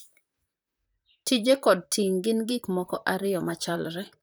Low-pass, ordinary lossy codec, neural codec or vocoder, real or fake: none; none; vocoder, 44.1 kHz, 128 mel bands, Pupu-Vocoder; fake